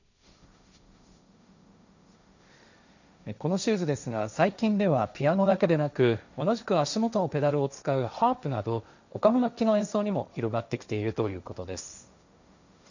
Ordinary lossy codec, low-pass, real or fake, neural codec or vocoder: none; 7.2 kHz; fake; codec, 16 kHz, 1.1 kbps, Voila-Tokenizer